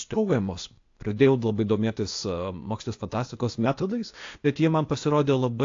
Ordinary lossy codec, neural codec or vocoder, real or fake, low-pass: AAC, 48 kbps; codec, 16 kHz, 0.8 kbps, ZipCodec; fake; 7.2 kHz